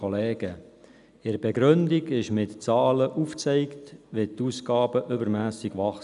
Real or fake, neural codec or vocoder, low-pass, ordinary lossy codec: real; none; 10.8 kHz; none